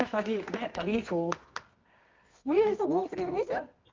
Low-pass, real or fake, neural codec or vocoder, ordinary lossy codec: 7.2 kHz; fake; codec, 24 kHz, 0.9 kbps, WavTokenizer, medium music audio release; Opus, 32 kbps